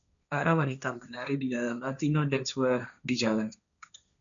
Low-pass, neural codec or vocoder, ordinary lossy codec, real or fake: 7.2 kHz; codec, 16 kHz, 1.1 kbps, Voila-Tokenizer; MP3, 96 kbps; fake